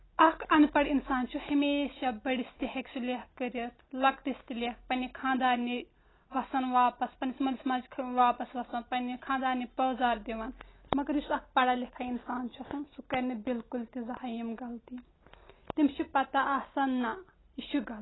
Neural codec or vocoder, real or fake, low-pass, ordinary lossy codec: none; real; 7.2 kHz; AAC, 16 kbps